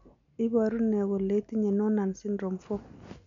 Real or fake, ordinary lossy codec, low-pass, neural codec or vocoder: real; MP3, 64 kbps; 7.2 kHz; none